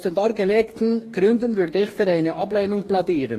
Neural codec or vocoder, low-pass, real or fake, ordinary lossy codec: codec, 44.1 kHz, 2.6 kbps, DAC; 14.4 kHz; fake; AAC, 48 kbps